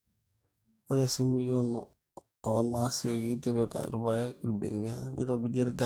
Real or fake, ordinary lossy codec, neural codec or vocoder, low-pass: fake; none; codec, 44.1 kHz, 2.6 kbps, DAC; none